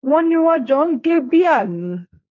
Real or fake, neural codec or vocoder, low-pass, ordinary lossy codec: fake; codec, 16 kHz, 1.1 kbps, Voila-Tokenizer; 7.2 kHz; none